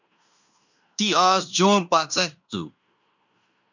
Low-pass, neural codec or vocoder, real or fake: 7.2 kHz; codec, 16 kHz in and 24 kHz out, 0.9 kbps, LongCat-Audio-Codec, fine tuned four codebook decoder; fake